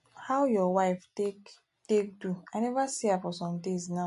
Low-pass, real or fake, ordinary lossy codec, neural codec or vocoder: 14.4 kHz; real; MP3, 48 kbps; none